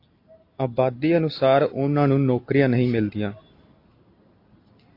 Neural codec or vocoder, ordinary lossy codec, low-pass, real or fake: none; AAC, 32 kbps; 5.4 kHz; real